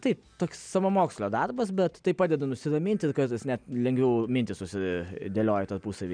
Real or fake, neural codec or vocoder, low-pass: real; none; 9.9 kHz